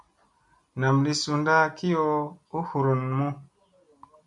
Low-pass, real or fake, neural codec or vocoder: 10.8 kHz; real; none